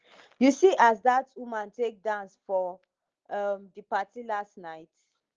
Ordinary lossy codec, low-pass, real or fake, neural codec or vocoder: Opus, 16 kbps; 7.2 kHz; real; none